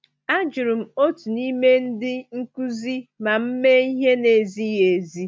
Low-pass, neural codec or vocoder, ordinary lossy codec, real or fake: none; none; none; real